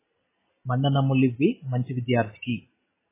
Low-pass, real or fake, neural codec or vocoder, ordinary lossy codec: 3.6 kHz; real; none; MP3, 16 kbps